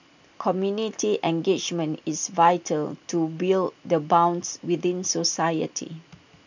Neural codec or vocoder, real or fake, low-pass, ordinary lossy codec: none; real; 7.2 kHz; none